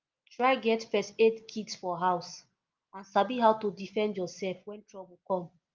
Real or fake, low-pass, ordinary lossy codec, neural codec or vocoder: real; 7.2 kHz; Opus, 32 kbps; none